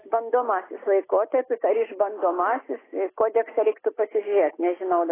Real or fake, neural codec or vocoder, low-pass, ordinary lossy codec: real; none; 3.6 kHz; AAC, 16 kbps